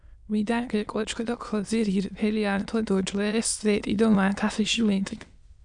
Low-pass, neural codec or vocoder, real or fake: 9.9 kHz; autoencoder, 22.05 kHz, a latent of 192 numbers a frame, VITS, trained on many speakers; fake